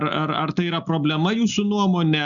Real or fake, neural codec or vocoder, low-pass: real; none; 7.2 kHz